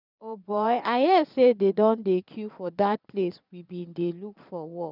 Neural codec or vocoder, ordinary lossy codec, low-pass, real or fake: vocoder, 22.05 kHz, 80 mel bands, Vocos; none; 5.4 kHz; fake